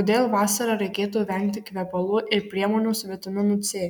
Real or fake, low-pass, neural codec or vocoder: real; 19.8 kHz; none